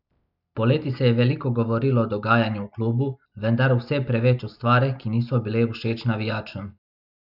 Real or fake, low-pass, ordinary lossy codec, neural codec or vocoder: real; 5.4 kHz; none; none